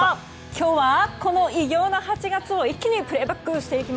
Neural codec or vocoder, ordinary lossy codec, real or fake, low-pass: none; none; real; none